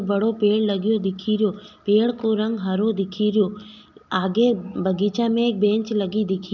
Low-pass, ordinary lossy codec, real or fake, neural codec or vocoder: 7.2 kHz; none; real; none